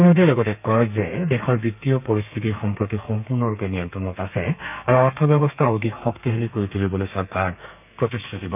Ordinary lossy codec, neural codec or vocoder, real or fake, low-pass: none; codec, 32 kHz, 1.9 kbps, SNAC; fake; 3.6 kHz